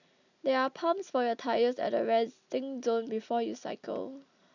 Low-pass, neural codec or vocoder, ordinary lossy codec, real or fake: 7.2 kHz; none; none; real